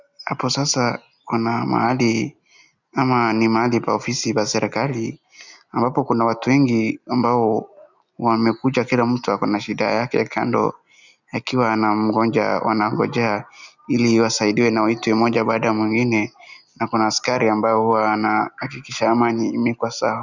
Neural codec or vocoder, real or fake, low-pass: none; real; 7.2 kHz